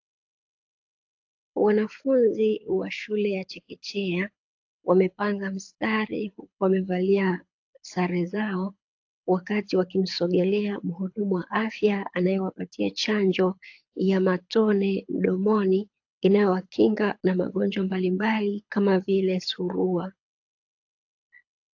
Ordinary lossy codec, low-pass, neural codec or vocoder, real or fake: AAC, 48 kbps; 7.2 kHz; codec, 24 kHz, 6 kbps, HILCodec; fake